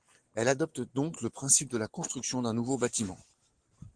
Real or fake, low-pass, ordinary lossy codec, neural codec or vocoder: real; 9.9 kHz; Opus, 16 kbps; none